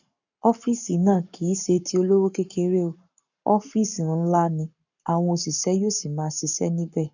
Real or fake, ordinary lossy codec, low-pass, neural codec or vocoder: fake; none; 7.2 kHz; codec, 44.1 kHz, 7.8 kbps, Pupu-Codec